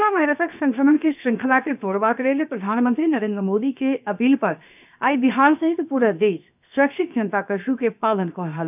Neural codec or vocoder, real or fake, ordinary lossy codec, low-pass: codec, 16 kHz, 0.7 kbps, FocalCodec; fake; none; 3.6 kHz